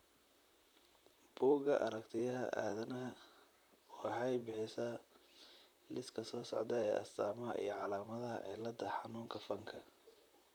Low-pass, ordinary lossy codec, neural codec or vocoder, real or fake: none; none; vocoder, 44.1 kHz, 128 mel bands, Pupu-Vocoder; fake